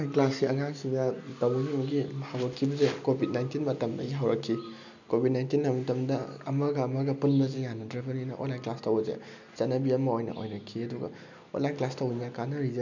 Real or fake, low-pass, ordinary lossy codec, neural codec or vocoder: real; 7.2 kHz; none; none